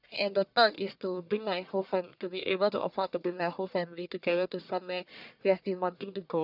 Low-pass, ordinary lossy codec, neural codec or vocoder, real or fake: 5.4 kHz; none; codec, 44.1 kHz, 1.7 kbps, Pupu-Codec; fake